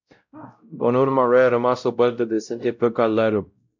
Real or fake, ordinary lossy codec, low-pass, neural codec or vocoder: fake; MP3, 64 kbps; 7.2 kHz; codec, 16 kHz, 0.5 kbps, X-Codec, WavLM features, trained on Multilingual LibriSpeech